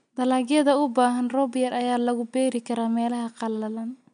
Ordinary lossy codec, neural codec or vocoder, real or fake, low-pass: MP3, 64 kbps; none; real; 9.9 kHz